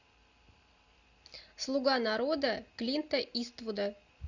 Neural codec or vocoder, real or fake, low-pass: none; real; 7.2 kHz